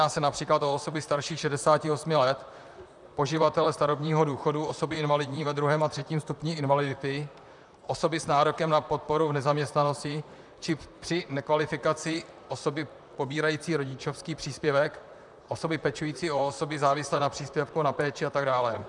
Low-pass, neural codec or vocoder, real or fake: 10.8 kHz; vocoder, 44.1 kHz, 128 mel bands, Pupu-Vocoder; fake